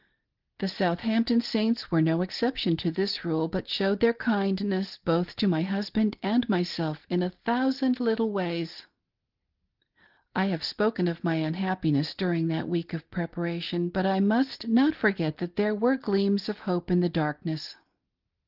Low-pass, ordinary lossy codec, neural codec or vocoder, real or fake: 5.4 kHz; Opus, 16 kbps; none; real